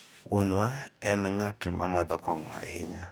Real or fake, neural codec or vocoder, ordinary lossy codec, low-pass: fake; codec, 44.1 kHz, 2.6 kbps, DAC; none; none